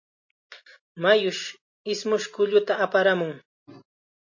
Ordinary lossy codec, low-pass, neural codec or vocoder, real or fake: MP3, 32 kbps; 7.2 kHz; none; real